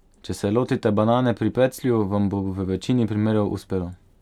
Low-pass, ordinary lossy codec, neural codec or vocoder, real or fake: 19.8 kHz; none; none; real